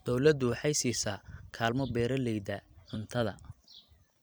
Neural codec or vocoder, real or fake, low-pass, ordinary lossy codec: vocoder, 44.1 kHz, 128 mel bands every 256 samples, BigVGAN v2; fake; none; none